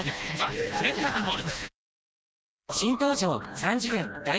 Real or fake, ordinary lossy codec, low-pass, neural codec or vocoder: fake; none; none; codec, 16 kHz, 1 kbps, FreqCodec, smaller model